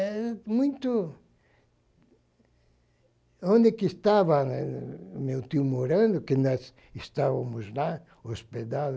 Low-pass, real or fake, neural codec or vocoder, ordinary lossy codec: none; real; none; none